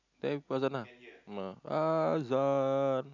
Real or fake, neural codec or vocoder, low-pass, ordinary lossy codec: real; none; 7.2 kHz; none